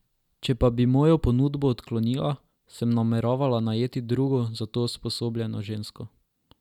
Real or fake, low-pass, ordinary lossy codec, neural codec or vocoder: real; 19.8 kHz; none; none